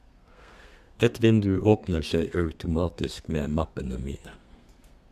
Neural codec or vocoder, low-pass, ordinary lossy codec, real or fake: codec, 32 kHz, 1.9 kbps, SNAC; 14.4 kHz; none; fake